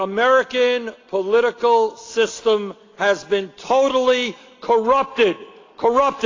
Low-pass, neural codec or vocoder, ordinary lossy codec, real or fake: 7.2 kHz; none; AAC, 32 kbps; real